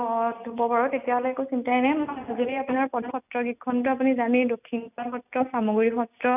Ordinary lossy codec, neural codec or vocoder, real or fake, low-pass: none; none; real; 3.6 kHz